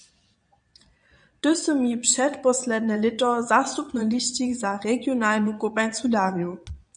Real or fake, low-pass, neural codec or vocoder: fake; 9.9 kHz; vocoder, 22.05 kHz, 80 mel bands, Vocos